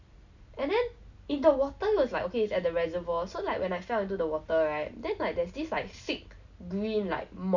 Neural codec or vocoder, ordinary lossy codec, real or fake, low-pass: none; none; real; 7.2 kHz